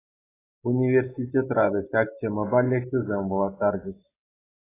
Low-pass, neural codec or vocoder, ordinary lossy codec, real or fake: 3.6 kHz; none; AAC, 16 kbps; real